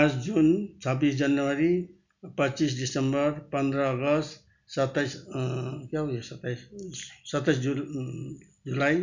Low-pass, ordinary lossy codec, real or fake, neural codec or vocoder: 7.2 kHz; none; real; none